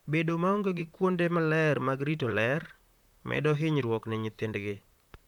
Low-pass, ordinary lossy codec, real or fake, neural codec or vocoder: 19.8 kHz; none; fake; vocoder, 44.1 kHz, 128 mel bands, Pupu-Vocoder